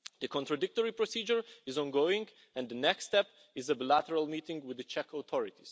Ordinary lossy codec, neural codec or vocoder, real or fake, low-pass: none; none; real; none